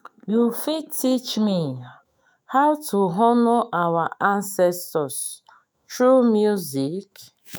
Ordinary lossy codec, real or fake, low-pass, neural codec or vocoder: none; fake; none; autoencoder, 48 kHz, 128 numbers a frame, DAC-VAE, trained on Japanese speech